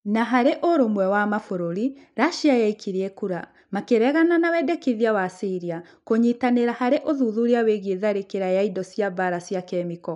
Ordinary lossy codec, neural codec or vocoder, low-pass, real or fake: none; none; 10.8 kHz; real